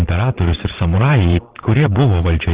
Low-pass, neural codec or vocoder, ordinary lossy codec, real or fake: 3.6 kHz; vocoder, 22.05 kHz, 80 mel bands, Vocos; Opus, 16 kbps; fake